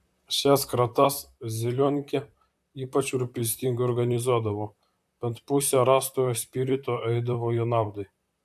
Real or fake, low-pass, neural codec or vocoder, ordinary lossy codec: fake; 14.4 kHz; vocoder, 44.1 kHz, 128 mel bands, Pupu-Vocoder; AAC, 96 kbps